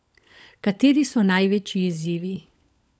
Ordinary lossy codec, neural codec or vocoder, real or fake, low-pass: none; codec, 16 kHz, 16 kbps, FunCodec, trained on LibriTTS, 50 frames a second; fake; none